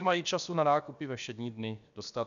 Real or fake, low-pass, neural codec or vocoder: fake; 7.2 kHz; codec, 16 kHz, about 1 kbps, DyCAST, with the encoder's durations